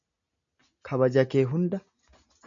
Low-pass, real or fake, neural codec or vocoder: 7.2 kHz; real; none